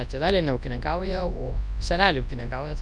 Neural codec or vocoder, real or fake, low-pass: codec, 24 kHz, 0.9 kbps, WavTokenizer, large speech release; fake; 9.9 kHz